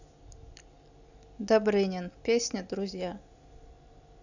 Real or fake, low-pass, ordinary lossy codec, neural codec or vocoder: real; 7.2 kHz; none; none